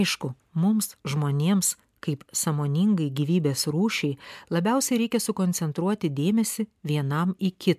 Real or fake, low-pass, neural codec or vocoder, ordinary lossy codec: real; 14.4 kHz; none; MP3, 96 kbps